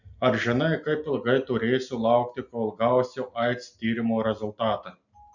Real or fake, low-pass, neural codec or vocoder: real; 7.2 kHz; none